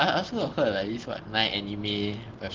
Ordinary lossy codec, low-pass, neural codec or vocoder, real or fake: Opus, 16 kbps; 7.2 kHz; none; real